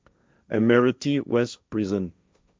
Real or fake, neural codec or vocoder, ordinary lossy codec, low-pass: fake; codec, 16 kHz, 1.1 kbps, Voila-Tokenizer; none; none